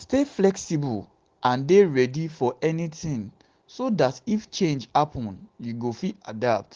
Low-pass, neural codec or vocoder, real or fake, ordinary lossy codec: 7.2 kHz; none; real; Opus, 16 kbps